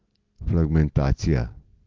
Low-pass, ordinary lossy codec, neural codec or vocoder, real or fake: 7.2 kHz; Opus, 16 kbps; none; real